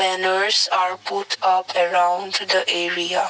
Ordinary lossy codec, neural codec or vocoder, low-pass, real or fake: none; none; none; real